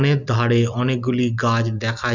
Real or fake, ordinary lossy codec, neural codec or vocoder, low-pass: real; none; none; 7.2 kHz